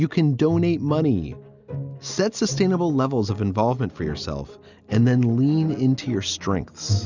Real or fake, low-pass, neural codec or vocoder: real; 7.2 kHz; none